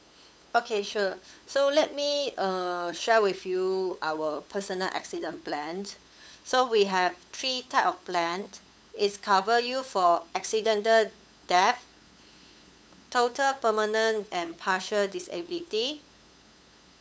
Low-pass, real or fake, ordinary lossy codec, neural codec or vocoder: none; fake; none; codec, 16 kHz, 8 kbps, FunCodec, trained on LibriTTS, 25 frames a second